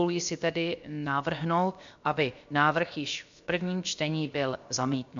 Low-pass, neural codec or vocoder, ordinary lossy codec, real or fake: 7.2 kHz; codec, 16 kHz, about 1 kbps, DyCAST, with the encoder's durations; MP3, 64 kbps; fake